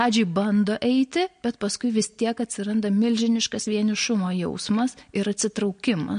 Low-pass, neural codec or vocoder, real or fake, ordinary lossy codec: 9.9 kHz; none; real; MP3, 48 kbps